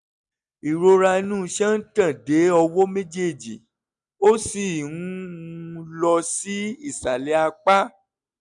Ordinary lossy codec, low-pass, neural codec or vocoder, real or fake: none; 10.8 kHz; none; real